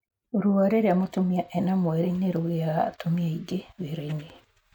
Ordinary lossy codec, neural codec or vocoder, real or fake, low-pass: none; none; real; 19.8 kHz